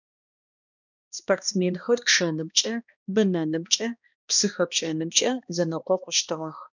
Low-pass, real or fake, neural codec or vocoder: 7.2 kHz; fake; codec, 16 kHz, 1 kbps, X-Codec, HuBERT features, trained on balanced general audio